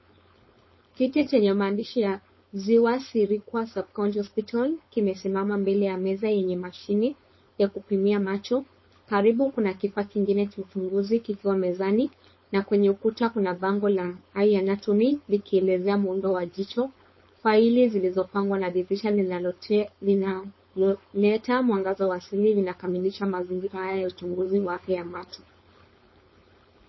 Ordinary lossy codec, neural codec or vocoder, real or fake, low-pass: MP3, 24 kbps; codec, 16 kHz, 4.8 kbps, FACodec; fake; 7.2 kHz